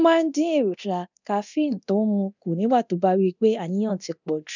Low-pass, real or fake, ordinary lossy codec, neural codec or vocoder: 7.2 kHz; fake; none; codec, 24 kHz, 0.9 kbps, DualCodec